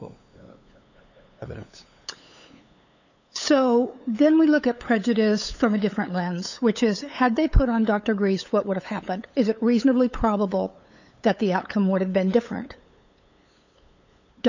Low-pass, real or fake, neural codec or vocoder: 7.2 kHz; fake; codec, 16 kHz, 8 kbps, FunCodec, trained on LibriTTS, 25 frames a second